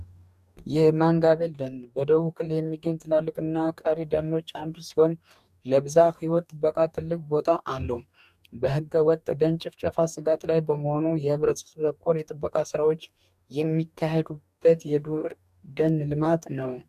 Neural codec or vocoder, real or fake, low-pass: codec, 44.1 kHz, 2.6 kbps, DAC; fake; 14.4 kHz